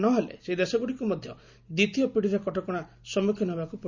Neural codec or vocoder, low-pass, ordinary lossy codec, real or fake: none; 7.2 kHz; none; real